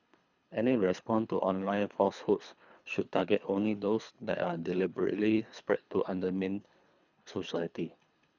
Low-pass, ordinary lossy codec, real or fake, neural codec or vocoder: 7.2 kHz; none; fake; codec, 24 kHz, 3 kbps, HILCodec